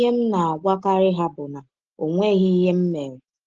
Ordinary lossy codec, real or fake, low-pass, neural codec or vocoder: Opus, 16 kbps; real; 7.2 kHz; none